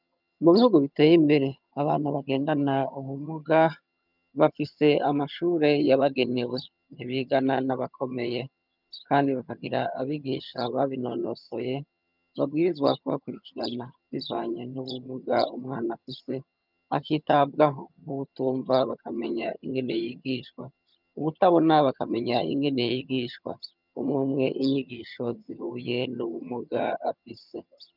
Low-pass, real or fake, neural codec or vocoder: 5.4 kHz; fake; vocoder, 22.05 kHz, 80 mel bands, HiFi-GAN